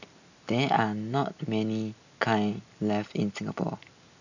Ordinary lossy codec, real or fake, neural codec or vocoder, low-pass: none; real; none; 7.2 kHz